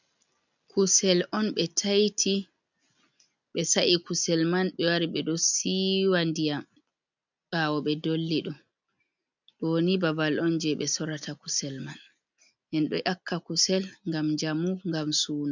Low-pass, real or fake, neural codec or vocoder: 7.2 kHz; real; none